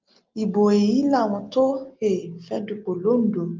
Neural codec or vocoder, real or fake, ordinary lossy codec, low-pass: none; real; Opus, 32 kbps; 7.2 kHz